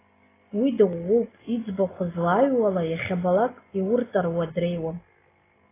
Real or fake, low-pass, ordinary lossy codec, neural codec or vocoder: real; 3.6 kHz; AAC, 16 kbps; none